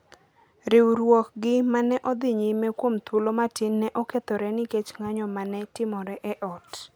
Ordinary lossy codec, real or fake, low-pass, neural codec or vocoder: none; real; none; none